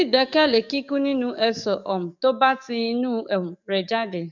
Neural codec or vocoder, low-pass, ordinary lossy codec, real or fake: codec, 44.1 kHz, 7.8 kbps, DAC; 7.2 kHz; none; fake